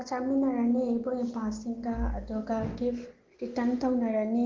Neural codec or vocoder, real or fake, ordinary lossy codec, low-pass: none; real; Opus, 16 kbps; 7.2 kHz